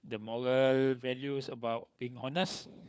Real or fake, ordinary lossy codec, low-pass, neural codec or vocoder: fake; none; none; codec, 16 kHz, 4 kbps, FunCodec, trained on LibriTTS, 50 frames a second